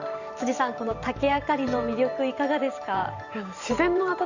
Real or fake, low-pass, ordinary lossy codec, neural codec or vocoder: fake; 7.2 kHz; Opus, 64 kbps; vocoder, 44.1 kHz, 128 mel bands every 512 samples, BigVGAN v2